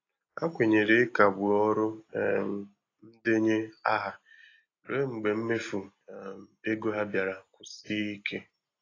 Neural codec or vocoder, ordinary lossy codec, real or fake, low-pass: none; AAC, 32 kbps; real; 7.2 kHz